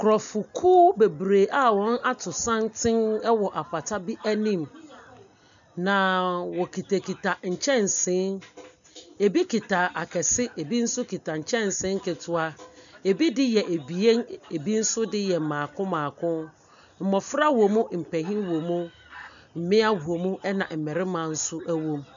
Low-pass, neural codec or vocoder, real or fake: 7.2 kHz; none; real